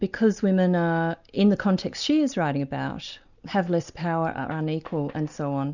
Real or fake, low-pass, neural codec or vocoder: real; 7.2 kHz; none